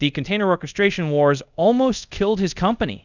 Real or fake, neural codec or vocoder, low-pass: fake; codec, 24 kHz, 0.5 kbps, DualCodec; 7.2 kHz